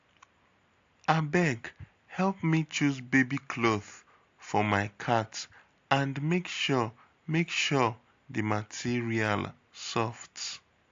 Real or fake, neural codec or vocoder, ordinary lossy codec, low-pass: real; none; AAC, 48 kbps; 7.2 kHz